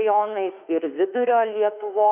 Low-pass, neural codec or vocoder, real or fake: 3.6 kHz; autoencoder, 48 kHz, 32 numbers a frame, DAC-VAE, trained on Japanese speech; fake